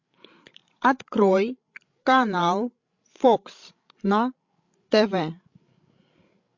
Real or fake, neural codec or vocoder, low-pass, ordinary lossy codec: fake; codec, 16 kHz, 16 kbps, FreqCodec, larger model; 7.2 kHz; MP3, 48 kbps